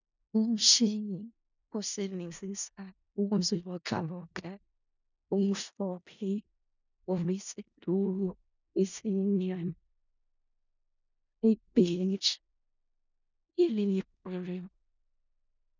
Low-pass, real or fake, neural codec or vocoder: 7.2 kHz; fake; codec, 16 kHz in and 24 kHz out, 0.4 kbps, LongCat-Audio-Codec, four codebook decoder